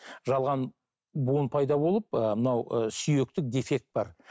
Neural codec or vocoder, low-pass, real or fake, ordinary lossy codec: none; none; real; none